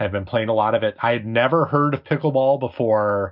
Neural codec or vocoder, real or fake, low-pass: none; real; 5.4 kHz